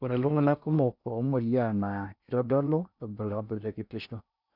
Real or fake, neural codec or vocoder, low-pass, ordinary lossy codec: fake; codec, 16 kHz in and 24 kHz out, 0.6 kbps, FocalCodec, streaming, 4096 codes; 5.4 kHz; none